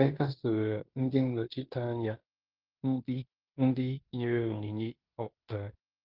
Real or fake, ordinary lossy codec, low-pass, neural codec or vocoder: fake; Opus, 32 kbps; 5.4 kHz; codec, 16 kHz in and 24 kHz out, 0.9 kbps, LongCat-Audio-Codec, fine tuned four codebook decoder